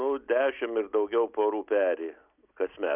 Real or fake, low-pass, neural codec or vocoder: real; 3.6 kHz; none